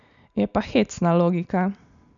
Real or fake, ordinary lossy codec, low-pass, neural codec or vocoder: real; none; 7.2 kHz; none